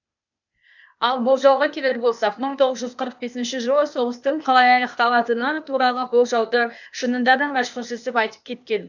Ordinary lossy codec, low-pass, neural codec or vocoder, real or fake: none; 7.2 kHz; codec, 16 kHz, 0.8 kbps, ZipCodec; fake